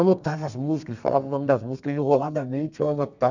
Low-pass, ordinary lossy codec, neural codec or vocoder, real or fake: 7.2 kHz; none; codec, 32 kHz, 1.9 kbps, SNAC; fake